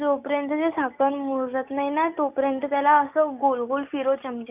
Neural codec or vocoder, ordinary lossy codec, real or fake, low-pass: none; none; real; 3.6 kHz